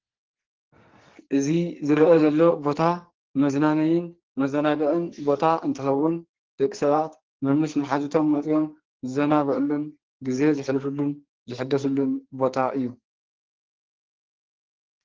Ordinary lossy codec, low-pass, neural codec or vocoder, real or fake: Opus, 16 kbps; 7.2 kHz; codec, 44.1 kHz, 2.6 kbps, SNAC; fake